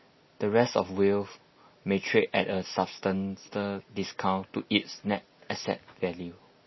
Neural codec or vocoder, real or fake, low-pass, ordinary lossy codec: none; real; 7.2 kHz; MP3, 24 kbps